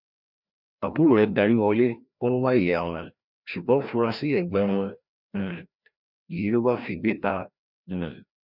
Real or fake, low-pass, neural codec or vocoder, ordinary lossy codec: fake; 5.4 kHz; codec, 16 kHz, 1 kbps, FreqCodec, larger model; none